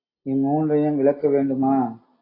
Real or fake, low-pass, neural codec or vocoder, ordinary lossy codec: real; 5.4 kHz; none; AAC, 24 kbps